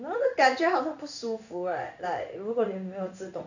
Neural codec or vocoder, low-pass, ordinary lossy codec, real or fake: codec, 16 kHz in and 24 kHz out, 1 kbps, XY-Tokenizer; 7.2 kHz; none; fake